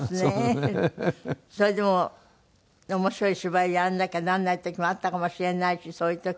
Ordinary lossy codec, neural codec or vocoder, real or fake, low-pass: none; none; real; none